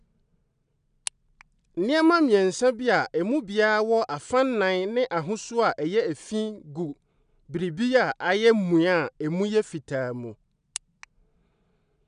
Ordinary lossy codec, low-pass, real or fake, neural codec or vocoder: none; 9.9 kHz; real; none